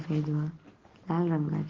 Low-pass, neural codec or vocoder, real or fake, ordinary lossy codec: 7.2 kHz; none; real; Opus, 16 kbps